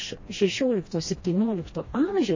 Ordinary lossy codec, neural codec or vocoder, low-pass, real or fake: MP3, 32 kbps; codec, 16 kHz, 1 kbps, FreqCodec, smaller model; 7.2 kHz; fake